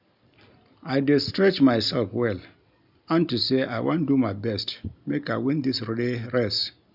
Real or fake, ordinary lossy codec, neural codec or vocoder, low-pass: real; none; none; 5.4 kHz